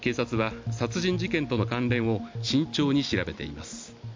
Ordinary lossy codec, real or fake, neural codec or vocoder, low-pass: MP3, 64 kbps; real; none; 7.2 kHz